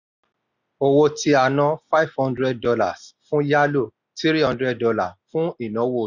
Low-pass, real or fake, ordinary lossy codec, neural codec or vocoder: 7.2 kHz; real; none; none